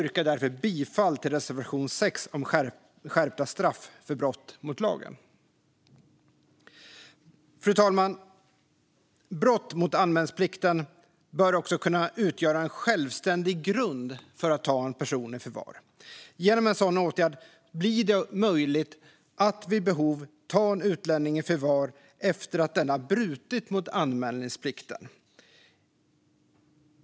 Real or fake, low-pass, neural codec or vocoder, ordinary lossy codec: real; none; none; none